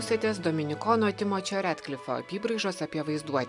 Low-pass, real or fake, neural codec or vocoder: 10.8 kHz; real; none